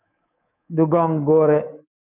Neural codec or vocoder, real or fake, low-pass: codec, 24 kHz, 3.1 kbps, DualCodec; fake; 3.6 kHz